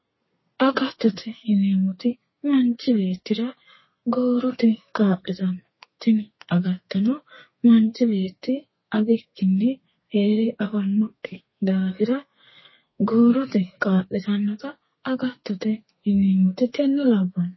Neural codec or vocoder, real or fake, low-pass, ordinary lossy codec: codec, 32 kHz, 1.9 kbps, SNAC; fake; 7.2 kHz; MP3, 24 kbps